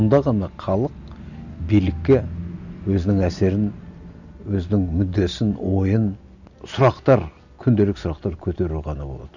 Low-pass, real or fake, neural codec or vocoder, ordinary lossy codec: 7.2 kHz; real; none; none